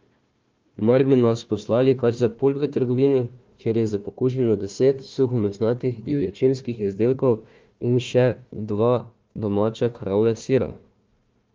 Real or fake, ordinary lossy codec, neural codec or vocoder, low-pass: fake; Opus, 24 kbps; codec, 16 kHz, 1 kbps, FunCodec, trained on Chinese and English, 50 frames a second; 7.2 kHz